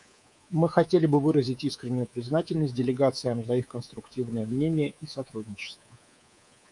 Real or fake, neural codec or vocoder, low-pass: fake; codec, 24 kHz, 3.1 kbps, DualCodec; 10.8 kHz